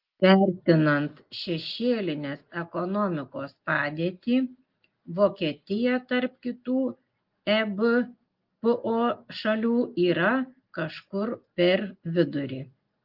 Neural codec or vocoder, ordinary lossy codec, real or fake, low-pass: none; Opus, 32 kbps; real; 5.4 kHz